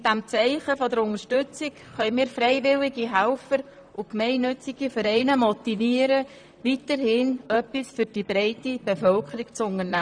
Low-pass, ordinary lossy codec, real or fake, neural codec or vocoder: 9.9 kHz; none; fake; vocoder, 44.1 kHz, 128 mel bands, Pupu-Vocoder